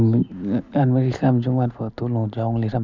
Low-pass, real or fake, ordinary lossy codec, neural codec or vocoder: 7.2 kHz; real; none; none